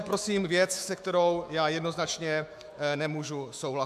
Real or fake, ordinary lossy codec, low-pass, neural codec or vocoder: fake; AAC, 96 kbps; 14.4 kHz; autoencoder, 48 kHz, 128 numbers a frame, DAC-VAE, trained on Japanese speech